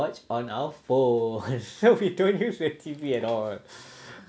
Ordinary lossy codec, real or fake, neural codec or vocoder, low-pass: none; real; none; none